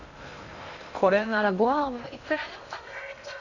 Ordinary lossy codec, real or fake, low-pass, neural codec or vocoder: none; fake; 7.2 kHz; codec, 16 kHz in and 24 kHz out, 0.6 kbps, FocalCodec, streaming, 2048 codes